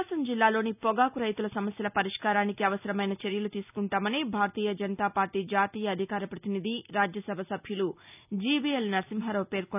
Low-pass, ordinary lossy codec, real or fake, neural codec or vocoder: 3.6 kHz; none; real; none